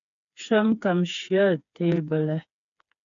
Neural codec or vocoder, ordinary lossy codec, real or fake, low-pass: codec, 16 kHz, 4 kbps, FreqCodec, smaller model; MP3, 64 kbps; fake; 7.2 kHz